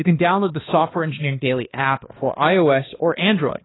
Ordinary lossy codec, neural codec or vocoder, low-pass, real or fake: AAC, 16 kbps; codec, 16 kHz, 2 kbps, X-Codec, HuBERT features, trained on balanced general audio; 7.2 kHz; fake